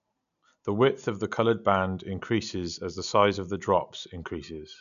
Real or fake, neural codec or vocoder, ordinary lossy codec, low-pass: real; none; none; 7.2 kHz